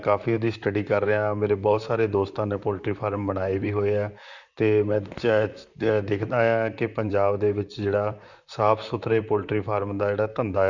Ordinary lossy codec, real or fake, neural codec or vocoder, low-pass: Opus, 64 kbps; fake; vocoder, 44.1 kHz, 128 mel bands, Pupu-Vocoder; 7.2 kHz